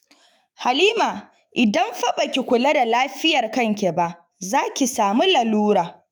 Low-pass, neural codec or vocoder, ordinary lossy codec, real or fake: none; autoencoder, 48 kHz, 128 numbers a frame, DAC-VAE, trained on Japanese speech; none; fake